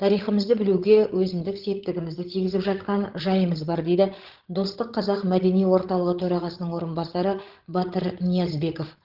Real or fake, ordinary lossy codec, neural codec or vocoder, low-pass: fake; Opus, 16 kbps; codec, 16 kHz, 16 kbps, FreqCodec, larger model; 5.4 kHz